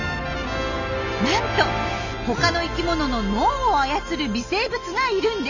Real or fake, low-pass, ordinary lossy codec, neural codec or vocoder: real; 7.2 kHz; none; none